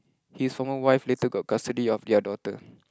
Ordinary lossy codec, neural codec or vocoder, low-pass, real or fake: none; none; none; real